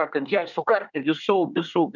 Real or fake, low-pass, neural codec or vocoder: fake; 7.2 kHz; codec, 24 kHz, 1 kbps, SNAC